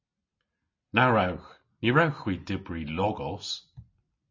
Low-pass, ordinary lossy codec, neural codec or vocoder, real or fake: 7.2 kHz; MP3, 32 kbps; none; real